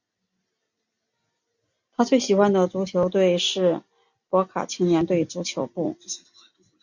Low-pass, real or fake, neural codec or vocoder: 7.2 kHz; real; none